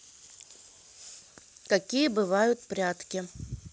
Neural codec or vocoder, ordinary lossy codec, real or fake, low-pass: none; none; real; none